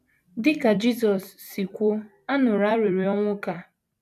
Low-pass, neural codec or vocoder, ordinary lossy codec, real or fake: 14.4 kHz; vocoder, 44.1 kHz, 128 mel bands every 256 samples, BigVGAN v2; none; fake